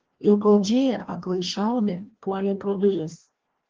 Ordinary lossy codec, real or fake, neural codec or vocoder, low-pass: Opus, 16 kbps; fake; codec, 16 kHz, 1 kbps, FreqCodec, larger model; 7.2 kHz